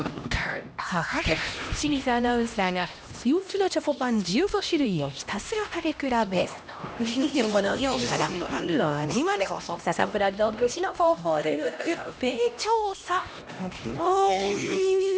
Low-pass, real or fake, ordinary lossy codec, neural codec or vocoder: none; fake; none; codec, 16 kHz, 1 kbps, X-Codec, HuBERT features, trained on LibriSpeech